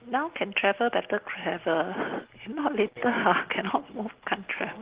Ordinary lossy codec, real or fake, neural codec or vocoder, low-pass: Opus, 16 kbps; real; none; 3.6 kHz